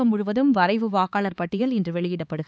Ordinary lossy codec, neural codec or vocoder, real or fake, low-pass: none; codec, 16 kHz, 4 kbps, X-Codec, HuBERT features, trained on LibriSpeech; fake; none